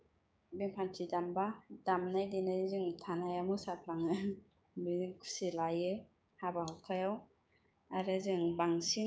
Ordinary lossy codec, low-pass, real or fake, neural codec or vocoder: none; none; fake; codec, 16 kHz, 6 kbps, DAC